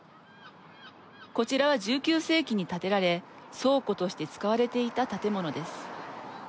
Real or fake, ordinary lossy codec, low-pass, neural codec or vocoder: real; none; none; none